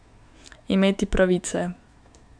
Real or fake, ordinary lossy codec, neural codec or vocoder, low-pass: fake; none; autoencoder, 48 kHz, 128 numbers a frame, DAC-VAE, trained on Japanese speech; 9.9 kHz